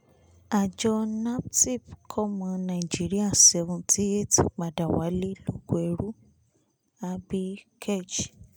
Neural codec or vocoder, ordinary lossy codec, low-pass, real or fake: none; none; none; real